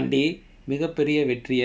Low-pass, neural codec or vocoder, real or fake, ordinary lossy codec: none; none; real; none